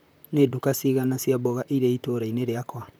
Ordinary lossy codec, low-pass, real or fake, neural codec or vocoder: none; none; fake; vocoder, 44.1 kHz, 128 mel bands, Pupu-Vocoder